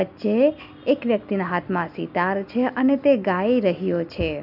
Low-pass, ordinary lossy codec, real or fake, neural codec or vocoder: 5.4 kHz; none; real; none